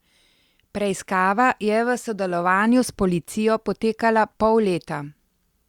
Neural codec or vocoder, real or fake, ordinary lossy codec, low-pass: none; real; Opus, 64 kbps; 19.8 kHz